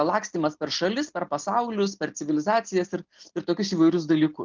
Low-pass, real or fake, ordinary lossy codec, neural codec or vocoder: 7.2 kHz; real; Opus, 32 kbps; none